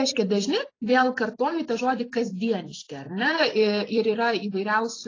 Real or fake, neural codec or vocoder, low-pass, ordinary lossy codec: fake; vocoder, 44.1 kHz, 128 mel bands every 256 samples, BigVGAN v2; 7.2 kHz; AAC, 32 kbps